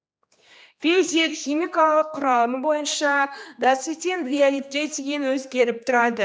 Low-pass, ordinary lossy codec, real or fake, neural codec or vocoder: none; none; fake; codec, 16 kHz, 2 kbps, X-Codec, HuBERT features, trained on general audio